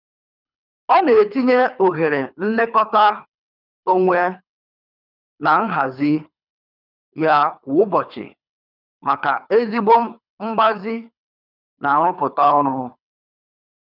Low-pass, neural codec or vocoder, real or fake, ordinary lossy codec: 5.4 kHz; codec, 24 kHz, 3 kbps, HILCodec; fake; none